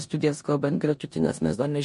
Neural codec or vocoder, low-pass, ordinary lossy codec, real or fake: codec, 16 kHz in and 24 kHz out, 0.9 kbps, LongCat-Audio-Codec, four codebook decoder; 10.8 kHz; MP3, 48 kbps; fake